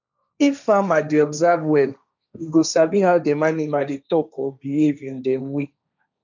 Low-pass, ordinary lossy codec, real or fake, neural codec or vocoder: 7.2 kHz; none; fake; codec, 16 kHz, 1.1 kbps, Voila-Tokenizer